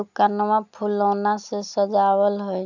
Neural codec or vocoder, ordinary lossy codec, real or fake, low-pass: none; none; real; 7.2 kHz